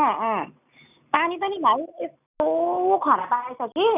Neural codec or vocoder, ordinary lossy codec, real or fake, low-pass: none; none; real; 3.6 kHz